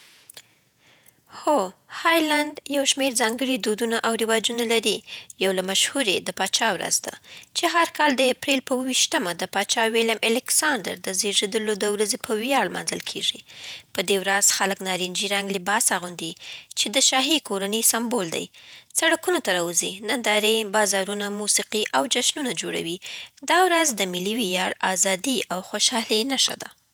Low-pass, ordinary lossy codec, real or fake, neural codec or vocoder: none; none; fake; vocoder, 48 kHz, 128 mel bands, Vocos